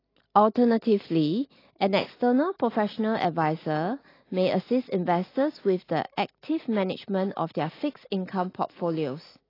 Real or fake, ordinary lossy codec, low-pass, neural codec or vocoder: real; AAC, 24 kbps; 5.4 kHz; none